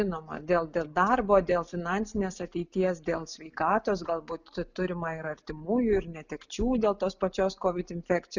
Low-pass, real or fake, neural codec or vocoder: 7.2 kHz; real; none